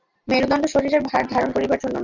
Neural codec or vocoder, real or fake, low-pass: none; real; 7.2 kHz